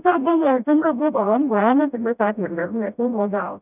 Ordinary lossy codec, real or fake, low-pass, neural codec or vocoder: none; fake; 3.6 kHz; codec, 16 kHz, 0.5 kbps, FreqCodec, smaller model